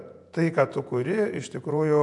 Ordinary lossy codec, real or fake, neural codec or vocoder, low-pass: AAC, 64 kbps; fake; vocoder, 48 kHz, 128 mel bands, Vocos; 10.8 kHz